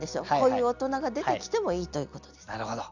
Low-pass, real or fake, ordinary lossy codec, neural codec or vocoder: 7.2 kHz; real; none; none